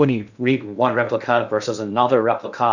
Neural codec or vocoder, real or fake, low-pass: codec, 16 kHz in and 24 kHz out, 0.6 kbps, FocalCodec, streaming, 2048 codes; fake; 7.2 kHz